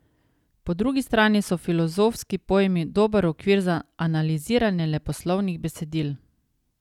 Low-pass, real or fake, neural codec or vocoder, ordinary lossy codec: 19.8 kHz; real; none; none